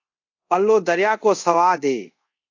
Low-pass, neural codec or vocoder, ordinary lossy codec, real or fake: 7.2 kHz; codec, 24 kHz, 0.5 kbps, DualCodec; AAC, 48 kbps; fake